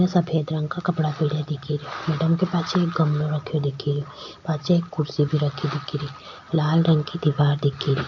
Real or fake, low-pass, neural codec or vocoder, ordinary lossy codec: real; 7.2 kHz; none; none